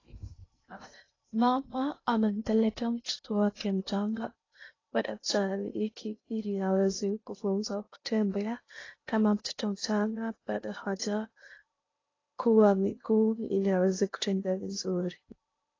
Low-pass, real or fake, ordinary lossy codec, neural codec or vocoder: 7.2 kHz; fake; AAC, 32 kbps; codec, 16 kHz in and 24 kHz out, 0.6 kbps, FocalCodec, streaming, 2048 codes